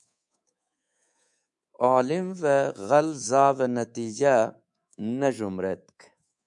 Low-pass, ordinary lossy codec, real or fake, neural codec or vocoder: 10.8 kHz; MP3, 96 kbps; fake; codec, 24 kHz, 3.1 kbps, DualCodec